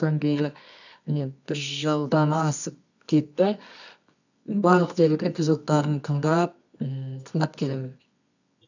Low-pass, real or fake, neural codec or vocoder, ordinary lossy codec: 7.2 kHz; fake; codec, 24 kHz, 0.9 kbps, WavTokenizer, medium music audio release; none